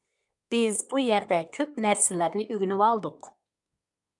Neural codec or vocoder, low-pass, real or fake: codec, 24 kHz, 1 kbps, SNAC; 10.8 kHz; fake